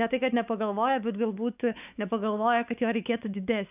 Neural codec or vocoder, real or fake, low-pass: codec, 16 kHz, 4 kbps, X-Codec, WavLM features, trained on Multilingual LibriSpeech; fake; 3.6 kHz